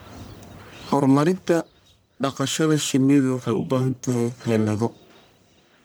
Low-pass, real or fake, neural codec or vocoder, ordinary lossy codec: none; fake; codec, 44.1 kHz, 1.7 kbps, Pupu-Codec; none